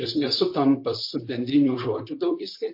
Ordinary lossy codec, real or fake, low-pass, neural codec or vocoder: MP3, 32 kbps; fake; 5.4 kHz; vocoder, 44.1 kHz, 128 mel bands, Pupu-Vocoder